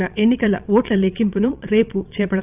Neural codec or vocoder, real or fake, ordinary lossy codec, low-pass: vocoder, 22.05 kHz, 80 mel bands, Vocos; fake; none; 3.6 kHz